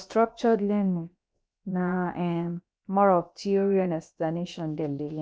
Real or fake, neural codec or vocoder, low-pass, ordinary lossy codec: fake; codec, 16 kHz, about 1 kbps, DyCAST, with the encoder's durations; none; none